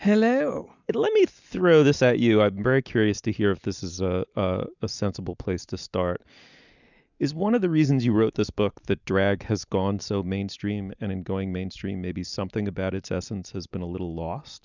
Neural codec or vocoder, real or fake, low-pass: none; real; 7.2 kHz